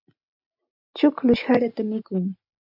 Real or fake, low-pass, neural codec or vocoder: real; 5.4 kHz; none